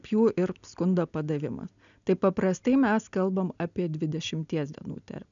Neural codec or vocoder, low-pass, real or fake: none; 7.2 kHz; real